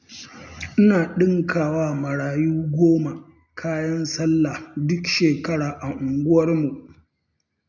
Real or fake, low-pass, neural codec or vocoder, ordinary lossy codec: real; 7.2 kHz; none; none